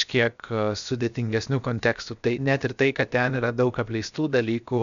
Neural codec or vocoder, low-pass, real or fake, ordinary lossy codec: codec, 16 kHz, 0.7 kbps, FocalCodec; 7.2 kHz; fake; MP3, 96 kbps